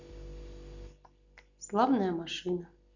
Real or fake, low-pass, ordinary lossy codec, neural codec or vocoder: real; 7.2 kHz; none; none